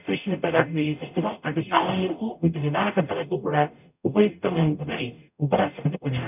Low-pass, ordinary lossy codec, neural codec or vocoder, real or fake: 3.6 kHz; none; codec, 44.1 kHz, 0.9 kbps, DAC; fake